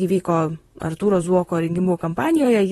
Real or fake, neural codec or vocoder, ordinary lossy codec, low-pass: fake; vocoder, 44.1 kHz, 128 mel bands, Pupu-Vocoder; AAC, 32 kbps; 19.8 kHz